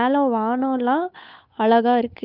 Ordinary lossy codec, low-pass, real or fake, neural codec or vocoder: none; 5.4 kHz; fake; codec, 16 kHz, 8 kbps, FunCodec, trained on Chinese and English, 25 frames a second